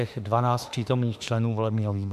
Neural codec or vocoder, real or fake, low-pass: autoencoder, 48 kHz, 32 numbers a frame, DAC-VAE, trained on Japanese speech; fake; 14.4 kHz